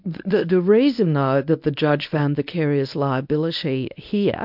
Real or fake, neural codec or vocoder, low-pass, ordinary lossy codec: fake; codec, 24 kHz, 0.9 kbps, WavTokenizer, medium speech release version 1; 5.4 kHz; MP3, 48 kbps